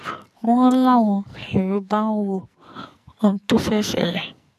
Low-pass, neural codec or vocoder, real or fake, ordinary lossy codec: 14.4 kHz; codec, 44.1 kHz, 2.6 kbps, SNAC; fake; none